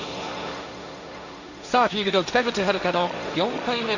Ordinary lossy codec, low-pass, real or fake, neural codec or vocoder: none; 7.2 kHz; fake; codec, 16 kHz, 1.1 kbps, Voila-Tokenizer